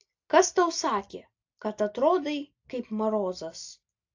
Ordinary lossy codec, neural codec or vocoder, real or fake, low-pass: AAC, 48 kbps; none; real; 7.2 kHz